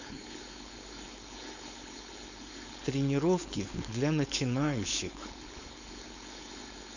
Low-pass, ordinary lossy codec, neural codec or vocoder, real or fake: 7.2 kHz; none; codec, 16 kHz, 4.8 kbps, FACodec; fake